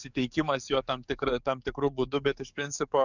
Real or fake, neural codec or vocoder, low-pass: fake; codec, 44.1 kHz, 7.8 kbps, DAC; 7.2 kHz